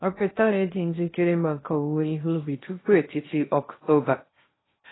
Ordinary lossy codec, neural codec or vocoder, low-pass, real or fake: AAC, 16 kbps; codec, 16 kHz in and 24 kHz out, 0.6 kbps, FocalCodec, streaming, 2048 codes; 7.2 kHz; fake